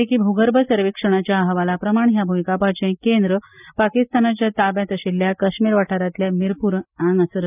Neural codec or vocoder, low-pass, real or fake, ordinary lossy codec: none; 3.6 kHz; real; none